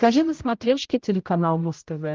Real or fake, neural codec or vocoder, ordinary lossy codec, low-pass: fake; codec, 16 kHz, 0.5 kbps, X-Codec, HuBERT features, trained on general audio; Opus, 32 kbps; 7.2 kHz